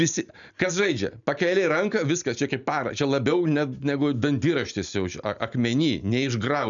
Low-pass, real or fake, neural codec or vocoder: 7.2 kHz; real; none